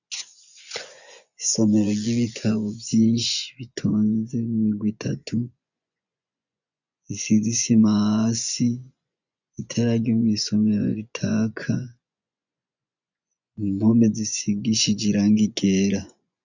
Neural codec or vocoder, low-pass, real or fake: vocoder, 24 kHz, 100 mel bands, Vocos; 7.2 kHz; fake